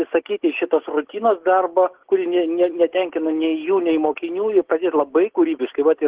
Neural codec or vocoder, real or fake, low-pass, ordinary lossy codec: none; real; 3.6 kHz; Opus, 16 kbps